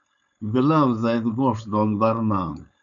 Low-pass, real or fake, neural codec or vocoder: 7.2 kHz; fake; codec, 16 kHz, 4.8 kbps, FACodec